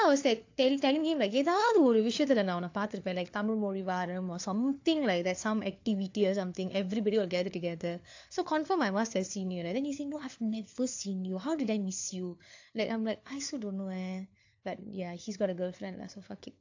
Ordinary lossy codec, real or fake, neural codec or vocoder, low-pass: AAC, 48 kbps; fake; codec, 16 kHz, 4 kbps, FunCodec, trained on LibriTTS, 50 frames a second; 7.2 kHz